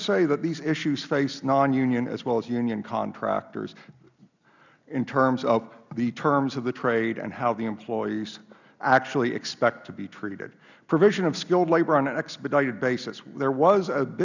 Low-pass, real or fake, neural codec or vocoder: 7.2 kHz; real; none